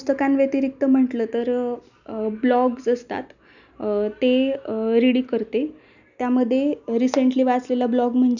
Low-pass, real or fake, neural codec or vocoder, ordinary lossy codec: 7.2 kHz; real; none; none